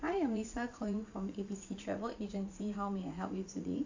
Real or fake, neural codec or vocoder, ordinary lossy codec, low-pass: fake; vocoder, 44.1 kHz, 80 mel bands, Vocos; AAC, 48 kbps; 7.2 kHz